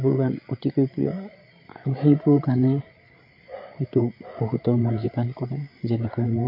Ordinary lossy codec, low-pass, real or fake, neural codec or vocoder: MP3, 32 kbps; 5.4 kHz; fake; codec, 16 kHz, 8 kbps, FreqCodec, larger model